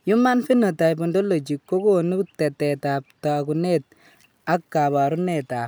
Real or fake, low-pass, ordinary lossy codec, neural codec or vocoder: real; none; none; none